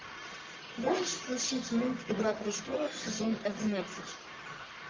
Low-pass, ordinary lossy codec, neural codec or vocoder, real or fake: 7.2 kHz; Opus, 32 kbps; codec, 44.1 kHz, 1.7 kbps, Pupu-Codec; fake